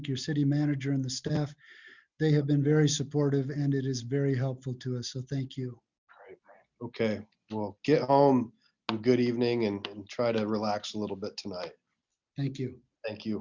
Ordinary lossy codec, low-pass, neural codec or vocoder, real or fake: Opus, 64 kbps; 7.2 kHz; none; real